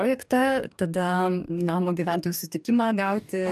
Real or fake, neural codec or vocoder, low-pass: fake; codec, 44.1 kHz, 2.6 kbps, DAC; 14.4 kHz